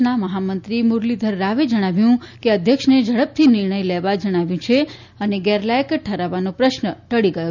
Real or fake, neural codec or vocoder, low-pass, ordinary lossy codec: real; none; 7.2 kHz; none